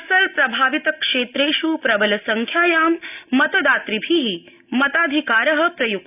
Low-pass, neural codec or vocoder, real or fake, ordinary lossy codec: 3.6 kHz; none; real; none